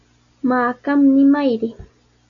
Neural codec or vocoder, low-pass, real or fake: none; 7.2 kHz; real